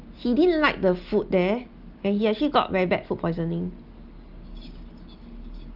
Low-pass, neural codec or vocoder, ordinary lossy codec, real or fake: 5.4 kHz; none; Opus, 32 kbps; real